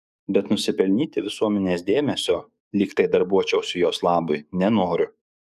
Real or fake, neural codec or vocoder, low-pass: fake; codec, 44.1 kHz, 7.8 kbps, DAC; 14.4 kHz